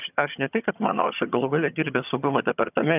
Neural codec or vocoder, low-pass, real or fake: vocoder, 22.05 kHz, 80 mel bands, HiFi-GAN; 3.6 kHz; fake